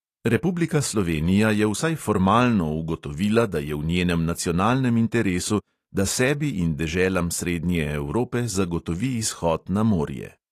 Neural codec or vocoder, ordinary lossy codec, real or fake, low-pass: none; AAC, 48 kbps; real; 14.4 kHz